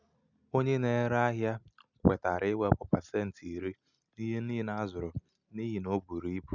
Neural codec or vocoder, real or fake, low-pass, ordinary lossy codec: none; real; 7.2 kHz; none